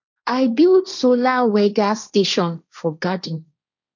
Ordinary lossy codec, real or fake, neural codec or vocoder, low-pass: none; fake; codec, 16 kHz, 1.1 kbps, Voila-Tokenizer; 7.2 kHz